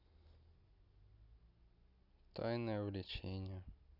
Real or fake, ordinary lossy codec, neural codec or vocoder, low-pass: real; none; none; 5.4 kHz